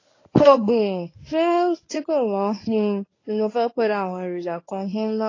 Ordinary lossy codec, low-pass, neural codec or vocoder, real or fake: AAC, 32 kbps; 7.2 kHz; codec, 24 kHz, 0.9 kbps, WavTokenizer, medium speech release version 1; fake